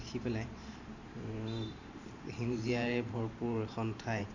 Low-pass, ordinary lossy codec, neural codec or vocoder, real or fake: 7.2 kHz; none; vocoder, 44.1 kHz, 128 mel bands every 512 samples, BigVGAN v2; fake